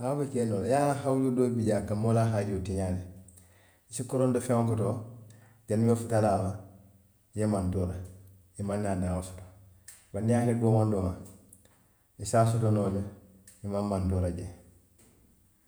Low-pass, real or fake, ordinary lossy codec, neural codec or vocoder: none; fake; none; vocoder, 48 kHz, 128 mel bands, Vocos